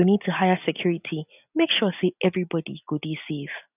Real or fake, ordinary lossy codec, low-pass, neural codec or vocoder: real; none; 3.6 kHz; none